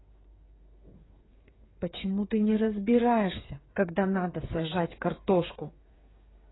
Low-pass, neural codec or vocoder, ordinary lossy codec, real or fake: 7.2 kHz; codec, 16 kHz, 8 kbps, FreqCodec, smaller model; AAC, 16 kbps; fake